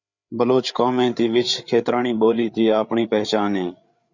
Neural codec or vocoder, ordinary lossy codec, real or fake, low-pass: codec, 16 kHz, 8 kbps, FreqCodec, larger model; Opus, 64 kbps; fake; 7.2 kHz